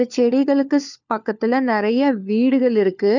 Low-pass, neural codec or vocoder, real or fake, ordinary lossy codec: 7.2 kHz; codec, 16 kHz, 4 kbps, FunCodec, trained on LibriTTS, 50 frames a second; fake; none